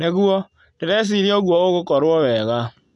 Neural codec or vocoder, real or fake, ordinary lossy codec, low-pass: none; real; none; 10.8 kHz